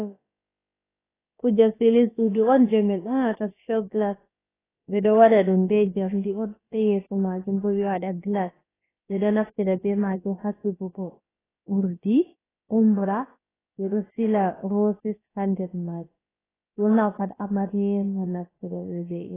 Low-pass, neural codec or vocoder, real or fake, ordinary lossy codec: 3.6 kHz; codec, 16 kHz, about 1 kbps, DyCAST, with the encoder's durations; fake; AAC, 16 kbps